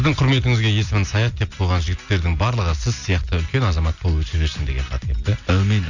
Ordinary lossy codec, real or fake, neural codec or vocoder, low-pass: none; real; none; 7.2 kHz